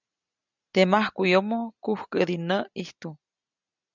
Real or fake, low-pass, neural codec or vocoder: real; 7.2 kHz; none